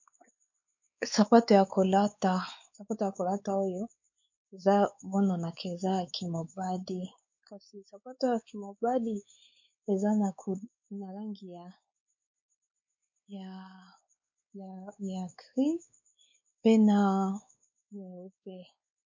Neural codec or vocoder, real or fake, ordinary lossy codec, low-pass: codec, 16 kHz, 4 kbps, X-Codec, WavLM features, trained on Multilingual LibriSpeech; fake; MP3, 48 kbps; 7.2 kHz